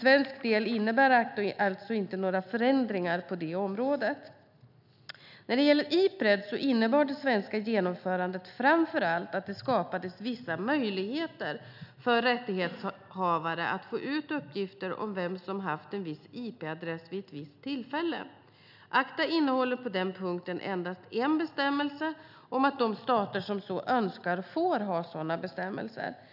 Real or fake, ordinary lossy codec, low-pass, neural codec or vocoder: real; none; 5.4 kHz; none